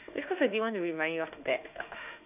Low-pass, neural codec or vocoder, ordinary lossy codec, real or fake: 3.6 kHz; autoencoder, 48 kHz, 32 numbers a frame, DAC-VAE, trained on Japanese speech; none; fake